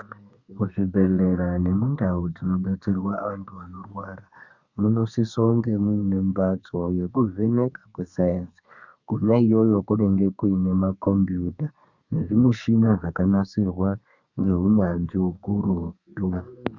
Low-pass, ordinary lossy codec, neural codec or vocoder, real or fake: 7.2 kHz; Opus, 64 kbps; codec, 32 kHz, 1.9 kbps, SNAC; fake